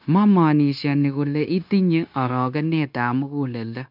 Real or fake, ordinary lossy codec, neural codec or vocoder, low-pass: fake; none; codec, 16 kHz, 0.9 kbps, LongCat-Audio-Codec; 5.4 kHz